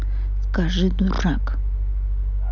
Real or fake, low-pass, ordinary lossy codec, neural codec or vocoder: real; 7.2 kHz; none; none